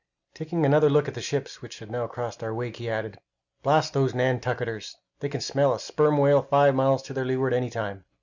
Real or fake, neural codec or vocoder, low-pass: real; none; 7.2 kHz